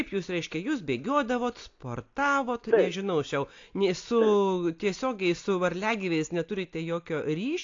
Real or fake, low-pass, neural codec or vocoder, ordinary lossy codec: real; 7.2 kHz; none; AAC, 48 kbps